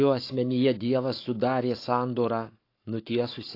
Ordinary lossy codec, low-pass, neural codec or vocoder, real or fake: AAC, 32 kbps; 5.4 kHz; codec, 44.1 kHz, 7.8 kbps, Pupu-Codec; fake